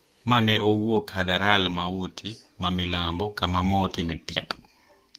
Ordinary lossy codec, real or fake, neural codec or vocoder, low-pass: Opus, 32 kbps; fake; codec, 32 kHz, 1.9 kbps, SNAC; 14.4 kHz